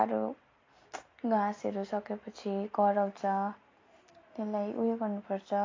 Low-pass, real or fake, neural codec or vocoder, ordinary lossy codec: 7.2 kHz; real; none; AAC, 32 kbps